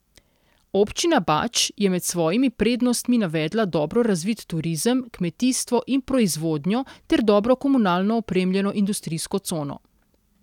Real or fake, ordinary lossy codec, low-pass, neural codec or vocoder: real; none; 19.8 kHz; none